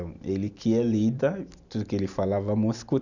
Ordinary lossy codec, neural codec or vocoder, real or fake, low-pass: none; none; real; 7.2 kHz